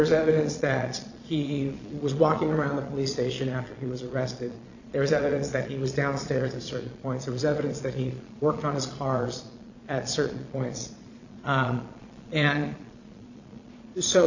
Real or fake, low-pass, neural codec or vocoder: fake; 7.2 kHz; vocoder, 22.05 kHz, 80 mel bands, Vocos